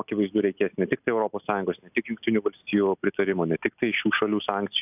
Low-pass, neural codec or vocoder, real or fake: 3.6 kHz; none; real